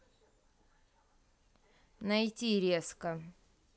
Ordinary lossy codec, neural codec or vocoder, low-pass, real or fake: none; none; none; real